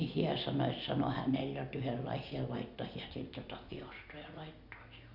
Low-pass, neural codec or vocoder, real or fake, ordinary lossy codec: 5.4 kHz; none; real; none